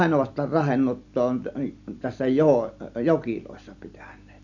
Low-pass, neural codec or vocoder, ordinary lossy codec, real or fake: 7.2 kHz; none; none; real